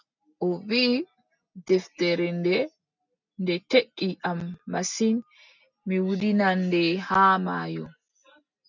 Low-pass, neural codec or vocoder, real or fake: 7.2 kHz; none; real